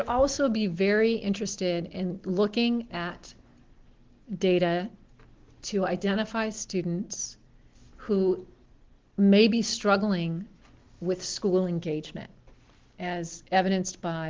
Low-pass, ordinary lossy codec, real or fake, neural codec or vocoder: 7.2 kHz; Opus, 16 kbps; real; none